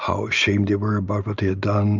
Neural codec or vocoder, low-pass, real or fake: none; 7.2 kHz; real